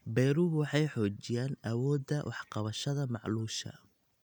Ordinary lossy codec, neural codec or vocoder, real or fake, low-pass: none; none; real; 19.8 kHz